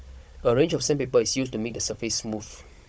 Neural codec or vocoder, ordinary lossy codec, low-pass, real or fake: codec, 16 kHz, 16 kbps, FunCodec, trained on Chinese and English, 50 frames a second; none; none; fake